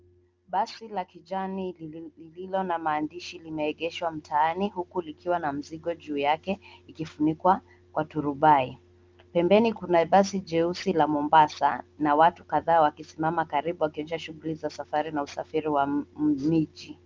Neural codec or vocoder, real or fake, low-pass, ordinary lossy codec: none; real; 7.2 kHz; Opus, 32 kbps